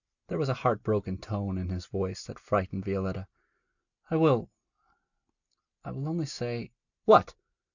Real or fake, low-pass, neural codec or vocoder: real; 7.2 kHz; none